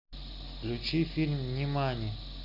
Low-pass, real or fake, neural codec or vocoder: 5.4 kHz; real; none